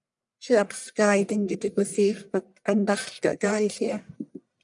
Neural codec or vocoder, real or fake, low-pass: codec, 44.1 kHz, 1.7 kbps, Pupu-Codec; fake; 10.8 kHz